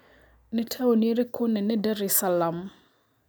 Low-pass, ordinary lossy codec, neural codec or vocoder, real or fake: none; none; none; real